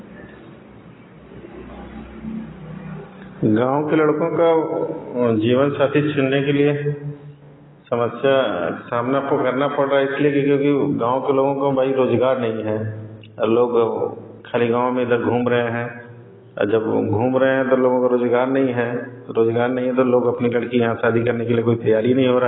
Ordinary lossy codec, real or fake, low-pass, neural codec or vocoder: AAC, 16 kbps; real; 7.2 kHz; none